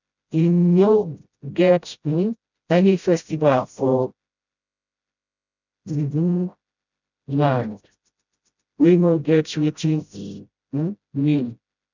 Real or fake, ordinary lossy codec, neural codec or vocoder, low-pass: fake; none; codec, 16 kHz, 0.5 kbps, FreqCodec, smaller model; 7.2 kHz